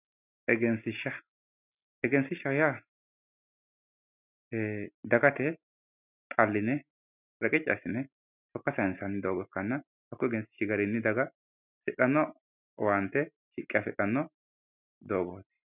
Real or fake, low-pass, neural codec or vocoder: real; 3.6 kHz; none